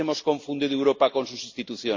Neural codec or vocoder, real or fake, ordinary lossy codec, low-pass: none; real; MP3, 64 kbps; 7.2 kHz